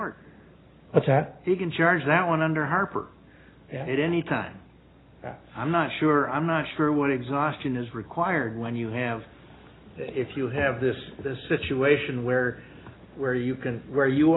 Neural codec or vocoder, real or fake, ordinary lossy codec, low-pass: none; real; AAC, 16 kbps; 7.2 kHz